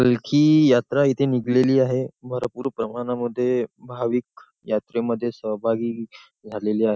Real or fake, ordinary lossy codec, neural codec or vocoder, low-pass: real; none; none; none